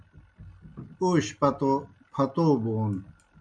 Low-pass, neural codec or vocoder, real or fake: 9.9 kHz; none; real